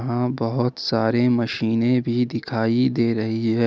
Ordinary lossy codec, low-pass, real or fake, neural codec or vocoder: none; none; real; none